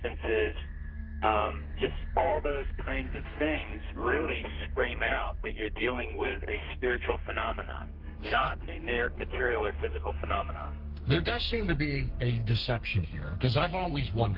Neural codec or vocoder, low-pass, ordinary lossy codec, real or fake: codec, 32 kHz, 1.9 kbps, SNAC; 5.4 kHz; Opus, 24 kbps; fake